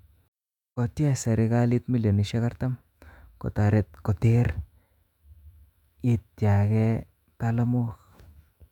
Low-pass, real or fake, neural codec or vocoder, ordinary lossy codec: 19.8 kHz; fake; autoencoder, 48 kHz, 128 numbers a frame, DAC-VAE, trained on Japanese speech; none